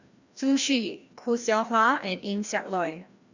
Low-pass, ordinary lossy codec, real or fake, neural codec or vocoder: 7.2 kHz; Opus, 64 kbps; fake; codec, 16 kHz, 1 kbps, FreqCodec, larger model